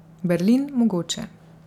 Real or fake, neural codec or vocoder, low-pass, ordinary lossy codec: real; none; 19.8 kHz; none